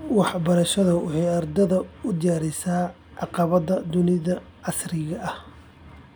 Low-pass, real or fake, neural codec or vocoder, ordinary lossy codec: none; real; none; none